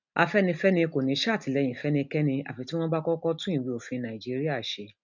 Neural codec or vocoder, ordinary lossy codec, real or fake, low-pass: none; none; real; 7.2 kHz